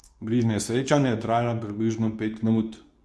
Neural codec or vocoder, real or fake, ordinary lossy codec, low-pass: codec, 24 kHz, 0.9 kbps, WavTokenizer, medium speech release version 2; fake; none; none